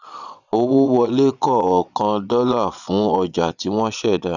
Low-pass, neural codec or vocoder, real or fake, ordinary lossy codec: 7.2 kHz; vocoder, 22.05 kHz, 80 mel bands, Vocos; fake; none